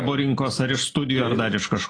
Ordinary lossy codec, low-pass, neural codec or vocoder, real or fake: AAC, 32 kbps; 9.9 kHz; none; real